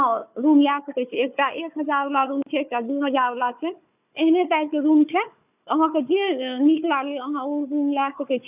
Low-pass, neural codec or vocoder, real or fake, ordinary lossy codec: 3.6 kHz; codec, 16 kHz, 4 kbps, FunCodec, trained on Chinese and English, 50 frames a second; fake; none